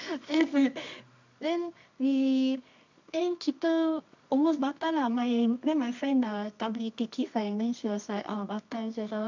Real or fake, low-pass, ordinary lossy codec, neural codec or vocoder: fake; 7.2 kHz; MP3, 64 kbps; codec, 24 kHz, 0.9 kbps, WavTokenizer, medium music audio release